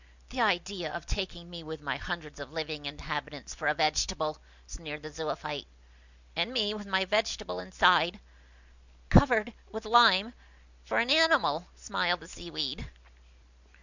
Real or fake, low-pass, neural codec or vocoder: real; 7.2 kHz; none